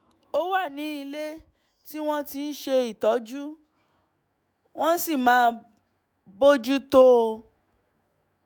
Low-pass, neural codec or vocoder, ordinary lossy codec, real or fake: none; autoencoder, 48 kHz, 128 numbers a frame, DAC-VAE, trained on Japanese speech; none; fake